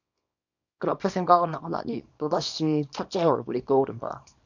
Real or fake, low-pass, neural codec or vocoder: fake; 7.2 kHz; codec, 24 kHz, 0.9 kbps, WavTokenizer, small release